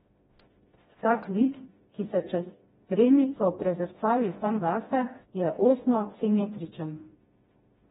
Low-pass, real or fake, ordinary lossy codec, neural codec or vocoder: 7.2 kHz; fake; AAC, 16 kbps; codec, 16 kHz, 1 kbps, FreqCodec, smaller model